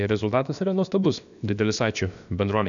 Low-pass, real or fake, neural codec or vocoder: 7.2 kHz; fake; codec, 16 kHz, about 1 kbps, DyCAST, with the encoder's durations